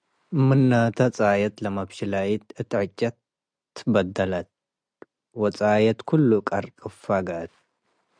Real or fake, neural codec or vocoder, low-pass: real; none; 9.9 kHz